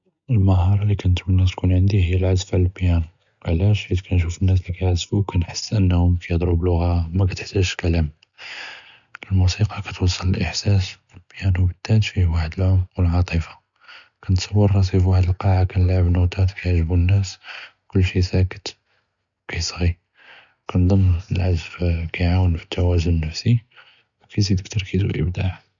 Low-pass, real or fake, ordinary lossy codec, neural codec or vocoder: 7.2 kHz; real; MP3, 96 kbps; none